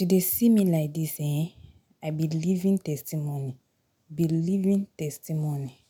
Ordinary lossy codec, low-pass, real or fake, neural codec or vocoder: none; none; real; none